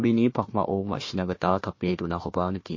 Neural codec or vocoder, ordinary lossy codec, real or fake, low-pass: codec, 16 kHz, 1 kbps, FunCodec, trained on Chinese and English, 50 frames a second; MP3, 32 kbps; fake; 7.2 kHz